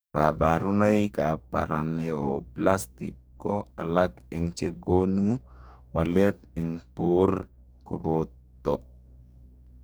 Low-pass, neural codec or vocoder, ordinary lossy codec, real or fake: none; codec, 44.1 kHz, 2.6 kbps, DAC; none; fake